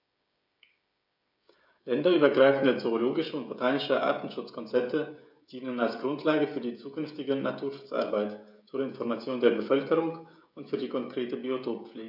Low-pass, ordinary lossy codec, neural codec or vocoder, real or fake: 5.4 kHz; none; codec, 16 kHz, 16 kbps, FreqCodec, smaller model; fake